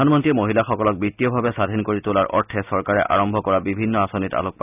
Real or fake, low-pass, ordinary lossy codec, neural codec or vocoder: real; 3.6 kHz; none; none